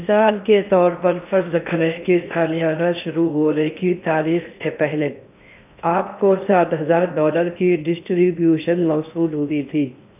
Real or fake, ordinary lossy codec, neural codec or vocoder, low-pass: fake; none; codec, 16 kHz in and 24 kHz out, 0.6 kbps, FocalCodec, streaming, 4096 codes; 3.6 kHz